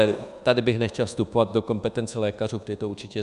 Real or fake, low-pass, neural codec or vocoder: fake; 10.8 kHz; codec, 24 kHz, 1.2 kbps, DualCodec